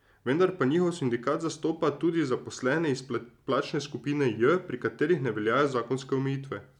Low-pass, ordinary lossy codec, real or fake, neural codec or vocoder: 19.8 kHz; none; real; none